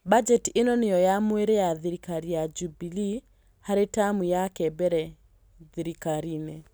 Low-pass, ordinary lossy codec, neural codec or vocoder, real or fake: none; none; none; real